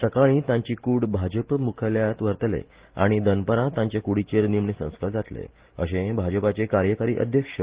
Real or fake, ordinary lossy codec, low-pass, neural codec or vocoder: real; Opus, 16 kbps; 3.6 kHz; none